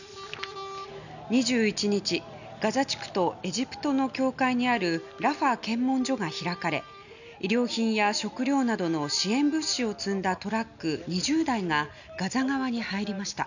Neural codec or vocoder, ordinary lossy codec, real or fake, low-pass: none; none; real; 7.2 kHz